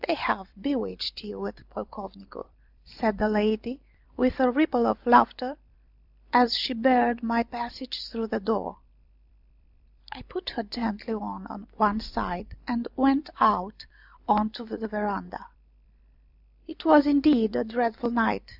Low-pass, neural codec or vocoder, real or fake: 5.4 kHz; vocoder, 44.1 kHz, 128 mel bands every 256 samples, BigVGAN v2; fake